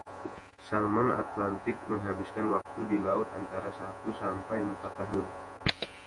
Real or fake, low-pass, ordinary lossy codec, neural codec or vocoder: fake; 10.8 kHz; MP3, 48 kbps; vocoder, 48 kHz, 128 mel bands, Vocos